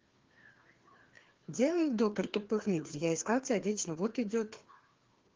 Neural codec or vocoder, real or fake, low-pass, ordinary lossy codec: codec, 16 kHz, 2 kbps, FreqCodec, larger model; fake; 7.2 kHz; Opus, 16 kbps